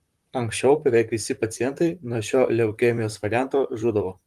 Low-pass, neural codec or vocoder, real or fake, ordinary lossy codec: 14.4 kHz; vocoder, 44.1 kHz, 128 mel bands, Pupu-Vocoder; fake; Opus, 32 kbps